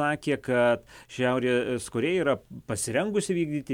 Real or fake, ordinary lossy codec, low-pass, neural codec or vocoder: real; MP3, 96 kbps; 19.8 kHz; none